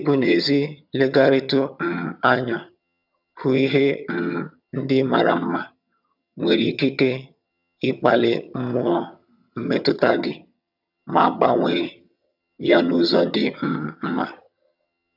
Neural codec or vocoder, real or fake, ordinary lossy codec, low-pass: vocoder, 22.05 kHz, 80 mel bands, HiFi-GAN; fake; none; 5.4 kHz